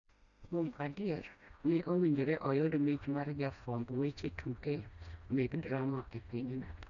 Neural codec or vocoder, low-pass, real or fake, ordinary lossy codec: codec, 16 kHz, 1 kbps, FreqCodec, smaller model; 7.2 kHz; fake; none